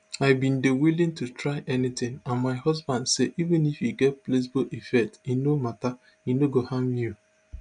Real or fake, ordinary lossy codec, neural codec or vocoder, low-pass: real; none; none; 9.9 kHz